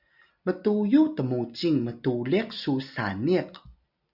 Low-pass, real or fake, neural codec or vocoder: 5.4 kHz; real; none